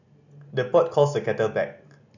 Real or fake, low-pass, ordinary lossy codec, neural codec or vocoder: real; 7.2 kHz; none; none